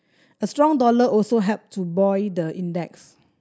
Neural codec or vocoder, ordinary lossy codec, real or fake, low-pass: none; none; real; none